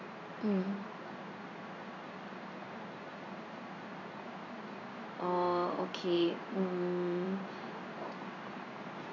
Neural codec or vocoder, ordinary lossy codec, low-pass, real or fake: codec, 16 kHz in and 24 kHz out, 1 kbps, XY-Tokenizer; none; 7.2 kHz; fake